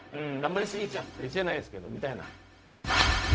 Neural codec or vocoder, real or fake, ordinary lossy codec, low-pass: codec, 16 kHz, 0.4 kbps, LongCat-Audio-Codec; fake; none; none